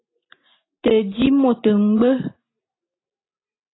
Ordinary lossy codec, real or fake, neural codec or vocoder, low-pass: AAC, 16 kbps; real; none; 7.2 kHz